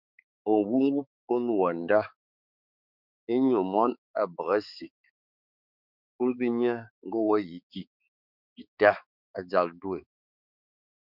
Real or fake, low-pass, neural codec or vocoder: fake; 5.4 kHz; codec, 16 kHz, 4 kbps, X-Codec, HuBERT features, trained on balanced general audio